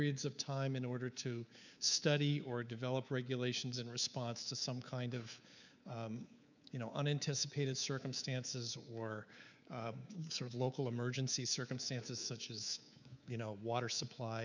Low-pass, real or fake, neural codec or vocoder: 7.2 kHz; fake; codec, 24 kHz, 3.1 kbps, DualCodec